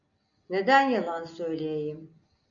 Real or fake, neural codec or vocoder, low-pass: real; none; 7.2 kHz